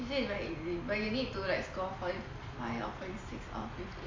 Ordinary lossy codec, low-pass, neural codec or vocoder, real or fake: MP3, 48 kbps; 7.2 kHz; none; real